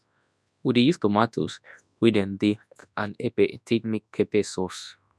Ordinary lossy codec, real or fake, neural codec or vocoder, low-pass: none; fake; codec, 24 kHz, 0.9 kbps, WavTokenizer, large speech release; none